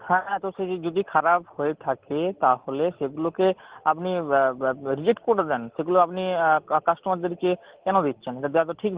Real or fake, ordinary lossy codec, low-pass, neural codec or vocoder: real; Opus, 16 kbps; 3.6 kHz; none